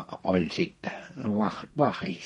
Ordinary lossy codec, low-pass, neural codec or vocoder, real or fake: MP3, 48 kbps; 14.4 kHz; codec, 32 kHz, 1.9 kbps, SNAC; fake